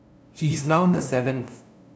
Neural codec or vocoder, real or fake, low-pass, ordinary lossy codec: codec, 16 kHz, 0.5 kbps, FunCodec, trained on LibriTTS, 25 frames a second; fake; none; none